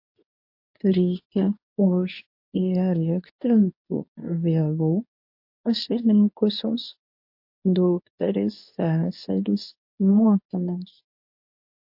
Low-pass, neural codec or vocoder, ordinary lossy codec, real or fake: 5.4 kHz; codec, 24 kHz, 0.9 kbps, WavTokenizer, medium speech release version 2; MP3, 32 kbps; fake